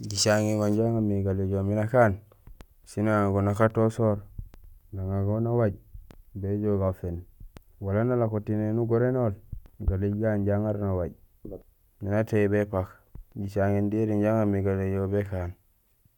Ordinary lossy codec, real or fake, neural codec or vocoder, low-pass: none; real; none; 19.8 kHz